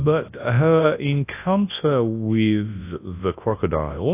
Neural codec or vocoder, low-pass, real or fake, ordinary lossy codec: codec, 24 kHz, 0.9 kbps, WavTokenizer, large speech release; 3.6 kHz; fake; AAC, 24 kbps